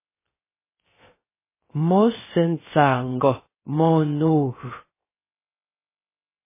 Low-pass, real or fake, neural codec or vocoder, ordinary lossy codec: 3.6 kHz; fake; codec, 16 kHz, 0.3 kbps, FocalCodec; MP3, 16 kbps